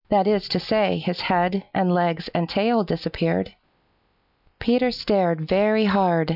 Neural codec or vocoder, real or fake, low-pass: none; real; 5.4 kHz